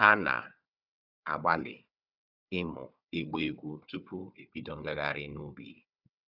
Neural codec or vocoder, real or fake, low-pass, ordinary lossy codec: codec, 16 kHz, 4 kbps, FunCodec, trained on Chinese and English, 50 frames a second; fake; 5.4 kHz; none